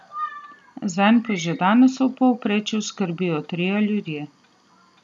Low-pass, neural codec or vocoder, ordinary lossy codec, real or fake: none; none; none; real